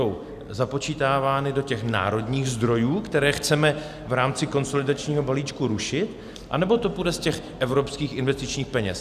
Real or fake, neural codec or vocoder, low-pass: real; none; 14.4 kHz